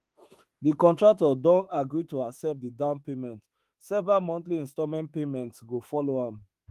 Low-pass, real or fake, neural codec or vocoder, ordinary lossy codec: 14.4 kHz; fake; autoencoder, 48 kHz, 32 numbers a frame, DAC-VAE, trained on Japanese speech; Opus, 24 kbps